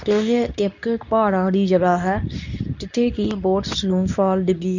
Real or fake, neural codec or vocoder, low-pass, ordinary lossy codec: fake; codec, 24 kHz, 0.9 kbps, WavTokenizer, medium speech release version 2; 7.2 kHz; none